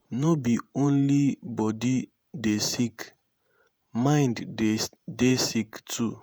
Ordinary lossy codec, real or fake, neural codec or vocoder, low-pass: none; fake; vocoder, 48 kHz, 128 mel bands, Vocos; none